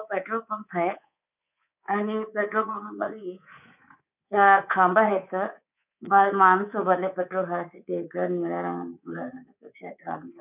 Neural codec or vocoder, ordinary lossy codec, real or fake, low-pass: codec, 24 kHz, 3.1 kbps, DualCodec; none; fake; 3.6 kHz